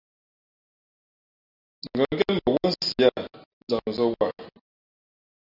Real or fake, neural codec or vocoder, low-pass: real; none; 5.4 kHz